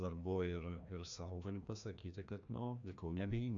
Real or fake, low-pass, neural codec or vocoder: fake; 7.2 kHz; codec, 16 kHz, 1 kbps, FreqCodec, larger model